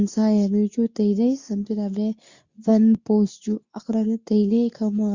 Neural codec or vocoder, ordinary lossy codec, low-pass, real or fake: codec, 24 kHz, 0.9 kbps, WavTokenizer, medium speech release version 2; Opus, 64 kbps; 7.2 kHz; fake